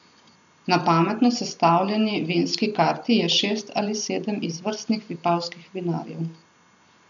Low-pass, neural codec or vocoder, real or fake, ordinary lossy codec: 7.2 kHz; none; real; none